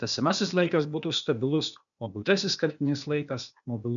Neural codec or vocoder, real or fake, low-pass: codec, 16 kHz, 0.8 kbps, ZipCodec; fake; 7.2 kHz